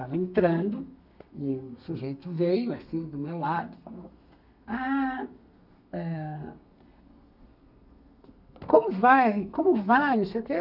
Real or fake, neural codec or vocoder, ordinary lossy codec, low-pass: fake; codec, 32 kHz, 1.9 kbps, SNAC; none; 5.4 kHz